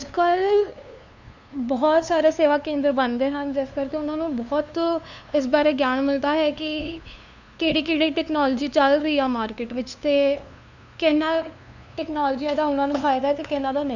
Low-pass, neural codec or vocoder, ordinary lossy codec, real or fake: 7.2 kHz; codec, 16 kHz, 2 kbps, FunCodec, trained on LibriTTS, 25 frames a second; none; fake